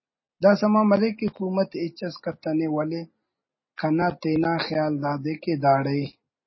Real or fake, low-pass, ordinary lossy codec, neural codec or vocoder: real; 7.2 kHz; MP3, 24 kbps; none